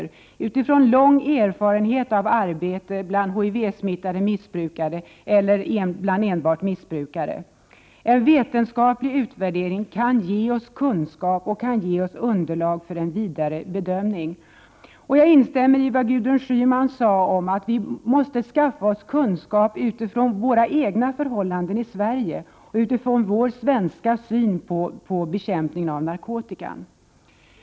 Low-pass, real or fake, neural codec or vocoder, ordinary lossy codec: none; real; none; none